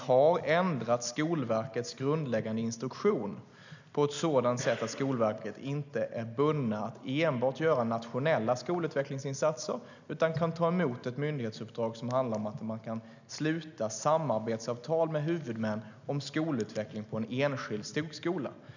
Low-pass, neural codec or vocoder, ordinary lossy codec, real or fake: 7.2 kHz; none; none; real